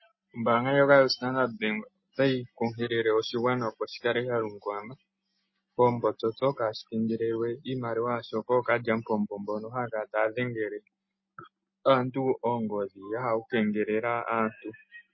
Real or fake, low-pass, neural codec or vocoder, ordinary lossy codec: real; 7.2 kHz; none; MP3, 24 kbps